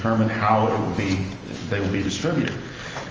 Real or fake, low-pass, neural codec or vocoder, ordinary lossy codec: real; 7.2 kHz; none; Opus, 24 kbps